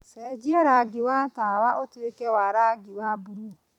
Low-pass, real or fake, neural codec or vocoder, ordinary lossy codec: 19.8 kHz; fake; vocoder, 44.1 kHz, 128 mel bands every 256 samples, BigVGAN v2; none